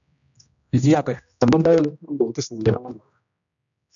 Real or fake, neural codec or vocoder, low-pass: fake; codec, 16 kHz, 1 kbps, X-Codec, HuBERT features, trained on general audio; 7.2 kHz